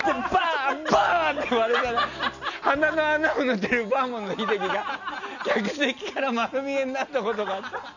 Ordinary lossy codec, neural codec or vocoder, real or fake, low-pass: none; none; real; 7.2 kHz